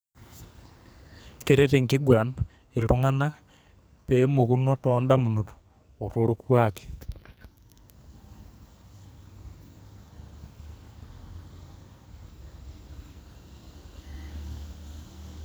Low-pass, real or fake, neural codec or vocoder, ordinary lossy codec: none; fake; codec, 44.1 kHz, 2.6 kbps, SNAC; none